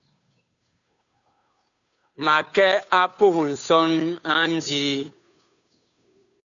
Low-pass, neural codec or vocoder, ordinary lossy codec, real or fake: 7.2 kHz; codec, 16 kHz, 2 kbps, FunCodec, trained on Chinese and English, 25 frames a second; MP3, 96 kbps; fake